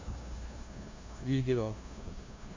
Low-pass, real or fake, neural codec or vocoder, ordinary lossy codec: 7.2 kHz; fake; codec, 16 kHz, 0.5 kbps, FunCodec, trained on LibriTTS, 25 frames a second; MP3, 64 kbps